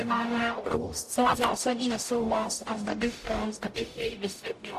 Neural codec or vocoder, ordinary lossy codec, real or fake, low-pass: codec, 44.1 kHz, 0.9 kbps, DAC; AAC, 64 kbps; fake; 14.4 kHz